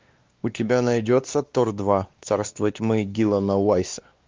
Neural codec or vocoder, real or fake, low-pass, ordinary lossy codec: codec, 16 kHz, 2 kbps, X-Codec, WavLM features, trained on Multilingual LibriSpeech; fake; 7.2 kHz; Opus, 24 kbps